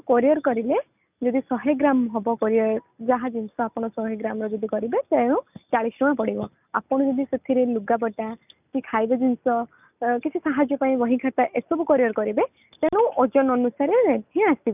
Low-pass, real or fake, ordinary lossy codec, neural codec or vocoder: 3.6 kHz; real; none; none